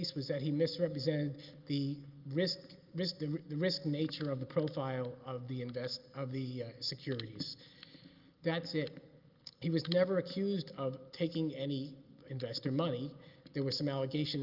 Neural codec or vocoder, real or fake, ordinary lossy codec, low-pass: none; real; Opus, 32 kbps; 5.4 kHz